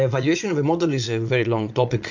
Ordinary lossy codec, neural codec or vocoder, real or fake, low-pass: MP3, 48 kbps; vocoder, 22.05 kHz, 80 mel bands, Vocos; fake; 7.2 kHz